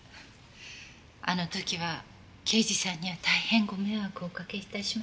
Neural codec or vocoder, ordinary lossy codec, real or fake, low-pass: none; none; real; none